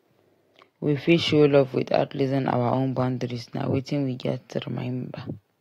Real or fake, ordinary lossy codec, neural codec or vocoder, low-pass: real; AAC, 48 kbps; none; 19.8 kHz